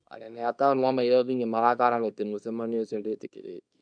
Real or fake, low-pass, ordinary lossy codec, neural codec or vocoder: fake; 9.9 kHz; none; codec, 24 kHz, 0.9 kbps, WavTokenizer, small release